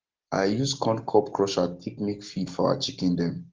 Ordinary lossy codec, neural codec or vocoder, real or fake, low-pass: Opus, 16 kbps; none; real; 7.2 kHz